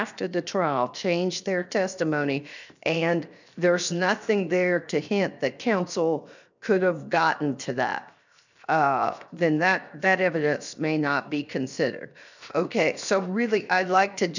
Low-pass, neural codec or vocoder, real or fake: 7.2 kHz; codec, 16 kHz, 0.7 kbps, FocalCodec; fake